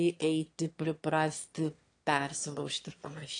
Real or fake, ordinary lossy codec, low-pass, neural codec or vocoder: fake; AAC, 48 kbps; 9.9 kHz; autoencoder, 22.05 kHz, a latent of 192 numbers a frame, VITS, trained on one speaker